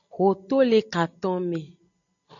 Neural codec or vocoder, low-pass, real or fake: none; 7.2 kHz; real